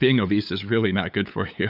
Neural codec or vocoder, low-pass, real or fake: codec, 16 kHz, 8 kbps, FunCodec, trained on LibriTTS, 25 frames a second; 5.4 kHz; fake